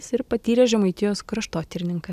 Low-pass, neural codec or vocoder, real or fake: 14.4 kHz; none; real